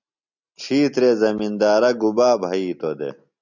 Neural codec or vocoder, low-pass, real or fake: none; 7.2 kHz; real